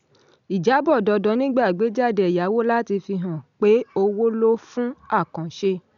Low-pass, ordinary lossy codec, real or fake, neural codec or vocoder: 7.2 kHz; none; real; none